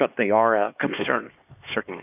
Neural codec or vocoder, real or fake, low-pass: codec, 16 kHz, 2 kbps, X-Codec, HuBERT features, trained on LibriSpeech; fake; 3.6 kHz